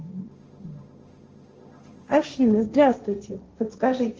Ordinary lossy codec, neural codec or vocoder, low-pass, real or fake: Opus, 24 kbps; codec, 16 kHz, 1.1 kbps, Voila-Tokenizer; 7.2 kHz; fake